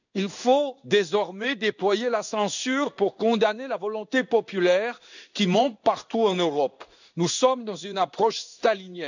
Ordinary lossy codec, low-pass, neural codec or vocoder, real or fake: none; 7.2 kHz; codec, 16 kHz in and 24 kHz out, 1 kbps, XY-Tokenizer; fake